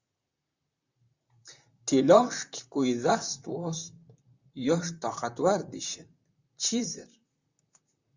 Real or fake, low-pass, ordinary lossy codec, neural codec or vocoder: fake; 7.2 kHz; Opus, 64 kbps; vocoder, 44.1 kHz, 128 mel bands, Pupu-Vocoder